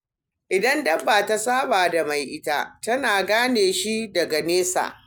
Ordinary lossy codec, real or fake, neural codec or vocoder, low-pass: none; real; none; none